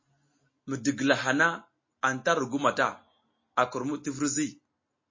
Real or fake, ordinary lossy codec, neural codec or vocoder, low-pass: real; MP3, 32 kbps; none; 7.2 kHz